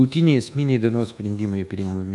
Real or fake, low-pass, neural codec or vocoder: fake; 10.8 kHz; codec, 24 kHz, 1.2 kbps, DualCodec